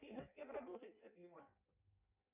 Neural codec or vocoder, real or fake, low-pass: codec, 16 kHz in and 24 kHz out, 0.6 kbps, FireRedTTS-2 codec; fake; 3.6 kHz